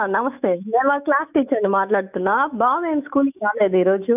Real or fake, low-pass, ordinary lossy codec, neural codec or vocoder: fake; 3.6 kHz; none; autoencoder, 48 kHz, 128 numbers a frame, DAC-VAE, trained on Japanese speech